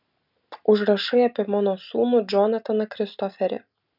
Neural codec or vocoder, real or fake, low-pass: none; real; 5.4 kHz